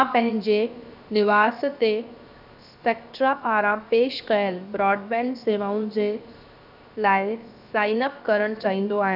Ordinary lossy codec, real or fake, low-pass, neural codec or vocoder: none; fake; 5.4 kHz; codec, 16 kHz, 0.7 kbps, FocalCodec